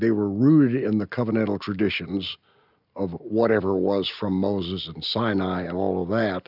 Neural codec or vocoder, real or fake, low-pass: none; real; 5.4 kHz